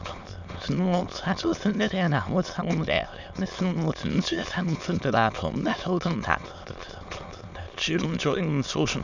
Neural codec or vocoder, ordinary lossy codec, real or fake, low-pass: autoencoder, 22.05 kHz, a latent of 192 numbers a frame, VITS, trained on many speakers; none; fake; 7.2 kHz